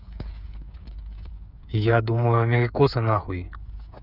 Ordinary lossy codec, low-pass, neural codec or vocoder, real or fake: none; 5.4 kHz; codec, 16 kHz, 8 kbps, FreqCodec, smaller model; fake